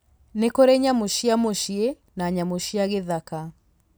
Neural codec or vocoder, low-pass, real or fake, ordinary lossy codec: none; none; real; none